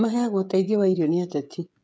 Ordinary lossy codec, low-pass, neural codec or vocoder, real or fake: none; none; codec, 16 kHz, 16 kbps, FreqCodec, smaller model; fake